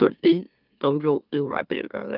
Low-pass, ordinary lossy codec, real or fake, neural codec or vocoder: 5.4 kHz; Opus, 24 kbps; fake; autoencoder, 44.1 kHz, a latent of 192 numbers a frame, MeloTTS